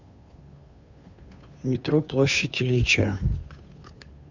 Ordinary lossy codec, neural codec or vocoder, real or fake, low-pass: none; codec, 16 kHz, 2 kbps, FunCodec, trained on Chinese and English, 25 frames a second; fake; 7.2 kHz